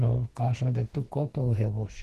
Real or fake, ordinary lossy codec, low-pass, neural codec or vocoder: fake; Opus, 16 kbps; 14.4 kHz; codec, 44.1 kHz, 2.6 kbps, SNAC